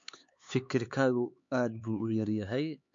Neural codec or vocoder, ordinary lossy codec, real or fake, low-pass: codec, 16 kHz, 4 kbps, X-Codec, HuBERT features, trained on LibriSpeech; MP3, 48 kbps; fake; 7.2 kHz